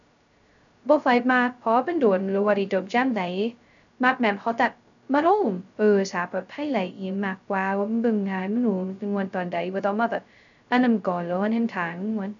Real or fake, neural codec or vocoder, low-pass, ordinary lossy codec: fake; codec, 16 kHz, 0.2 kbps, FocalCodec; 7.2 kHz; none